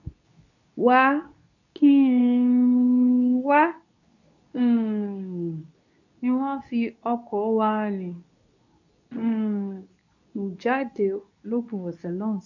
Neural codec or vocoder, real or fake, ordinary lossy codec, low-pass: codec, 24 kHz, 0.9 kbps, WavTokenizer, medium speech release version 2; fake; none; 7.2 kHz